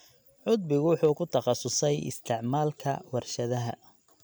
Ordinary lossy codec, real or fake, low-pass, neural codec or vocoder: none; real; none; none